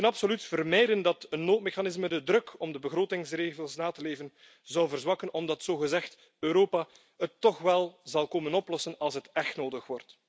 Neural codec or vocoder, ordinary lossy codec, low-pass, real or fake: none; none; none; real